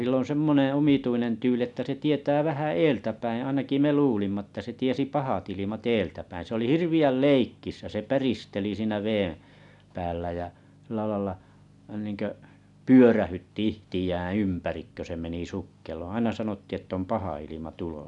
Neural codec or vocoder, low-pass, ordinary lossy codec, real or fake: none; 10.8 kHz; none; real